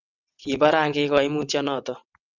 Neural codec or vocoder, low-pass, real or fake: vocoder, 22.05 kHz, 80 mel bands, WaveNeXt; 7.2 kHz; fake